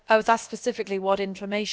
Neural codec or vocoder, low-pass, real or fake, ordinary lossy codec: codec, 16 kHz, about 1 kbps, DyCAST, with the encoder's durations; none; fake; none